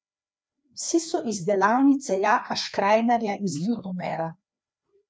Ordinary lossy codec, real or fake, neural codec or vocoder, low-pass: none; fake; codec, 16 kHz, 2 kbps, FreqCodec, larger model; none